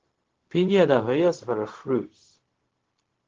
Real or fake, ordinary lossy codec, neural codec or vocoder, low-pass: fake; Opus, 16 kbps; codec, 16 kHz, 0.4 kbps, LongCat-Audio-Codec; 7.2 kHz